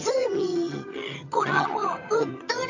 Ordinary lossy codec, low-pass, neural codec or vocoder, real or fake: AAC, 48 kbps; 7.2 kHz; vocoder, 22.05 kHz, 80 mel bands, HiFi-GAN; fake